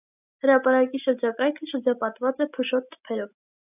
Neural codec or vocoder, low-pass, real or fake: none; 3.6 kHz; real